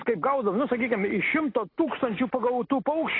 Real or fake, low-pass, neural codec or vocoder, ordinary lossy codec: real; 5.4 kHz; none; AAC, 24 kbps